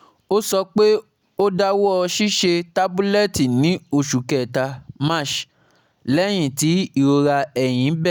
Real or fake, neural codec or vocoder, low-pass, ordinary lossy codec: real; none; none; none